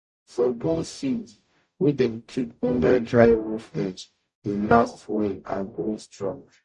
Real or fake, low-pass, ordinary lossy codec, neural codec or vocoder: fake; 10.8 kHz; MP3, 48 kbps; codec, 44.1 kHz, 0.9 kbps, DAC